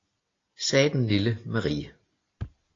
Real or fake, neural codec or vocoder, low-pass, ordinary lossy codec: real; none; 7.2 kHz; AAC, 32 kbps